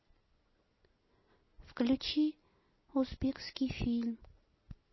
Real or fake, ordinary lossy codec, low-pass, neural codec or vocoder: real; MP3, 24 kbps; 7.2 kHz; none